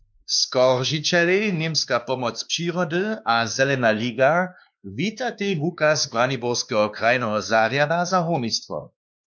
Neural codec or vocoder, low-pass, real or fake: codec, 16 kHz, 2 kbps, X-Codec, WavLM features, trained on Multilingual LibriSpeech; 7.2 kHz; fake